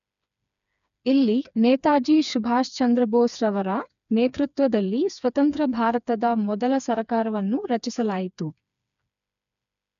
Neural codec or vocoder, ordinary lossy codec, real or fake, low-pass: codec, 16 kHz, 4 kbps, FreqCodec, smaller model; none; fake; 7.2 kHz